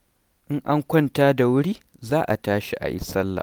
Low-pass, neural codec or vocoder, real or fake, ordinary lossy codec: none; none; real; none